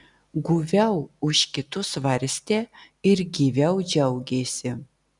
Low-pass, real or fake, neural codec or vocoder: 10.8 kHz; real; none